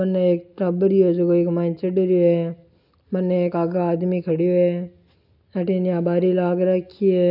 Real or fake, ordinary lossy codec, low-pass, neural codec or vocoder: real; none; 5.4 kHz; none